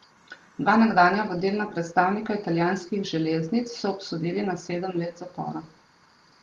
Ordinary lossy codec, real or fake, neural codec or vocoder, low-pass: Opus, 24 kbps; real; none; 19.8 kHz